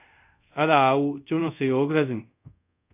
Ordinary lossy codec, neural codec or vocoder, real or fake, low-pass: AAC, 32 kbps; codec, 24 kHz, 0.5 kbps, DualCodec; fake; 3.6 kHz